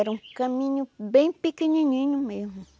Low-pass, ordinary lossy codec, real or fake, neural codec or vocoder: none; none; real; none